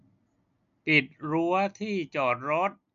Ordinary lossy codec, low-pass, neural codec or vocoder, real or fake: none; 7.2 kHz; none; real